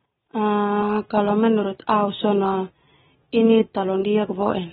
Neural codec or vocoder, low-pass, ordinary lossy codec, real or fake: none; 7.2 kHz; AAC, 16 kbps; real